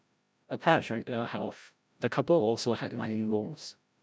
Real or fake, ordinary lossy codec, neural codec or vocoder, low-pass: fake; none; codec, 16 kHz, 0.5 kbps, FreqCodec, larger model; none